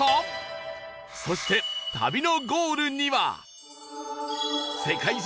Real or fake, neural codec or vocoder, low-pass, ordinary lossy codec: real; none; none; none